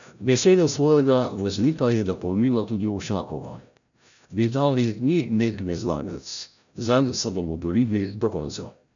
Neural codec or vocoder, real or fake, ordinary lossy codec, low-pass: codec, 16 kHz, 0.5 kbps, FreqCodec, larger model; fake; none; 7.2 kHz